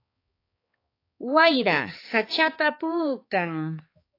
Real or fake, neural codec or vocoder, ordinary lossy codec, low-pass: fake; codec, 16 kHz, 4 kbps, X-Codec, HuBERT features, trained on balanced general audio; AAC, 32 kbps; 5.4 kHz